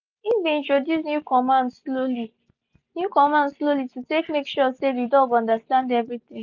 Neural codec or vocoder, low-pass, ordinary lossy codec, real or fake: none; 7.2 kHz; none; real